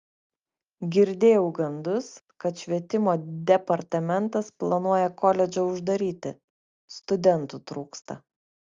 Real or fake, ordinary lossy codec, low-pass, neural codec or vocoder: real; Opus, 32 kbps; 7.2 kHz; none